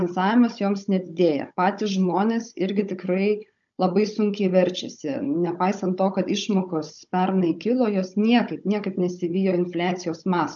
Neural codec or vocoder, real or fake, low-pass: codec, 16 kHz, 16 kbps, FunCodec, trained on Chinese and English, 50 frames a second; fake; 7.2 kHz